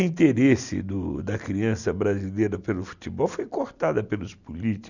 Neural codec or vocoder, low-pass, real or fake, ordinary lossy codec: none; 7.2 kHz; real; none